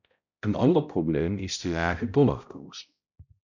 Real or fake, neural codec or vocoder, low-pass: fake; codec, 16 kHz, 0.5 kbps, X-Codec, HuBERT features, trained on balanced general audio; 7.2 kHz